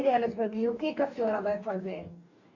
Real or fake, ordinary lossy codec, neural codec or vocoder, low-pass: fake; none; codec, 44.1 kHz, 2.6 kbps, DAC; 7.2 kHz